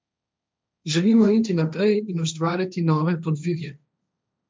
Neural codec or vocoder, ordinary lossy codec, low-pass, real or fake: codec, 16 kHz, 1.1 kbps, Voila-Tokenizer; none; none; fake